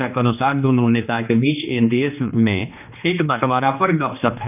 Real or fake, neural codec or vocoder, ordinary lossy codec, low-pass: fake; codec, 16 kHz, 2 kbps, X-Codec, HuBERT features, trained on general audio; none; 3.6 kHz